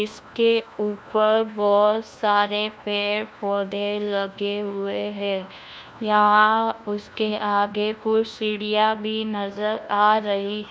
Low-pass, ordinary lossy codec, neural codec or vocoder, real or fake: none; none; codec, 16 kHz, 1 kbps, FunCodec, trained on LibriTTS, 50 frames a second; fake